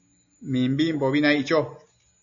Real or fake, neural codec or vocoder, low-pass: real; none; 7.2 kHz